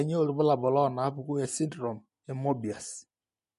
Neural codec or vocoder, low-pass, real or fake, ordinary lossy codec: none; 14.4 kHz; real; MP3, 48 kbps